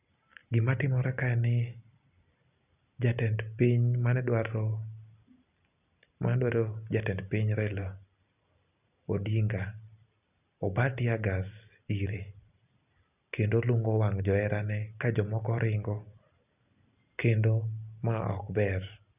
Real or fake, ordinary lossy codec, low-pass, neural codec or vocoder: real; none; 3.6 kHz; none